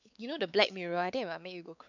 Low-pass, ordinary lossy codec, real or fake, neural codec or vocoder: 7.2 kHz; none; fake; codec, 16 kHz, 4 kbps, X-Codec, WavLM features, trained on Multilingual LibriSpeech